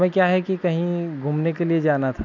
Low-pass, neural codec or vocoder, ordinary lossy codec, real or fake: 7.2 kHz; none; none; real